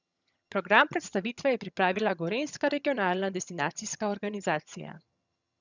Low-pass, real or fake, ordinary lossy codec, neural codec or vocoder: 7.2 kHz; fake; none; vocoder, 22.05 kHz, 80 mel bands, HiFi-GAN